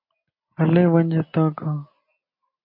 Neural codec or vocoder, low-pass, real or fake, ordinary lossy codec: none; 5.4 kHz; real; MP3, 32 kbps